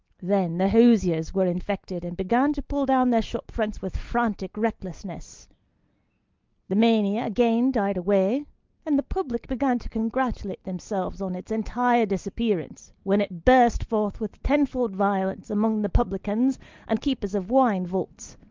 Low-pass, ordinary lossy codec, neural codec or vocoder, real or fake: 7.2 kHz; Opus, 32 kbps; none; real